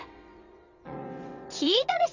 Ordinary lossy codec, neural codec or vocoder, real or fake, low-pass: none; vocoder, 22.05 kHz, 80 mel bands, WaveNeXt; fake; 7.2 kHz